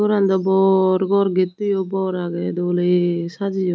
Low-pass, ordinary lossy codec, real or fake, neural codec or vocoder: none; none; real; none